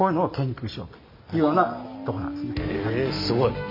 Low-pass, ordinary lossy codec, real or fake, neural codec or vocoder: 5.4 kHz; MP3, 32 kbps; real; none